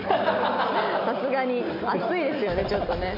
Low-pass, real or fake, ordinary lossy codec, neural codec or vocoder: 5.4 kHz; real; none; none